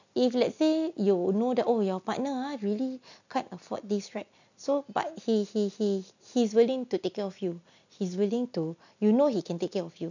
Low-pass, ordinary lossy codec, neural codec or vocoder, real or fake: 7.2 kHz; none; none; real